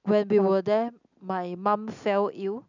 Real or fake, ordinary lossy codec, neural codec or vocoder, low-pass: real; none; none; 7.2 kHz